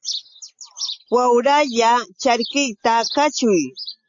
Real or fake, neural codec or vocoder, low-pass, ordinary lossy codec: real; none; 7.2 kHz; AAC, 64 kbps